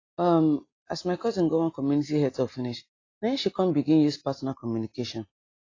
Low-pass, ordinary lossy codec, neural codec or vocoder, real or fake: 7.2 kHz; AAC, 32 kbps; none; real